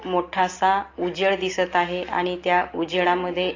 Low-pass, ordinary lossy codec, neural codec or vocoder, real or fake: 7.2 kHz; AAC, 32 kbps; none; real